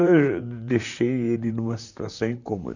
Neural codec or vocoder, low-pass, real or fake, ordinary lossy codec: vocoder, 44.1 kHz, 128 mel bands, Pupu-Vocoder; 7.2 kHz; fake; none